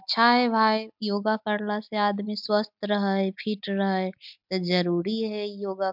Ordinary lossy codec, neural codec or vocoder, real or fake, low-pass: MP3, 48 kbps; none; real; 5.4 kHz